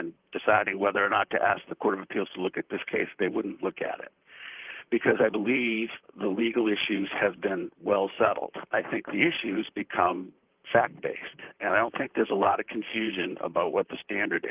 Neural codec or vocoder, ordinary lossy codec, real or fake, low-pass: vocoder, 44.1 kHz, 128 mel bands, Pupu-Vocoder; Opus, 32 kbps; fake; 3.6 kHz